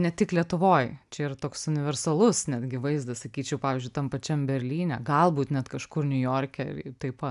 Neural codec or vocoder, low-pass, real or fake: none; 10.8 kHz; real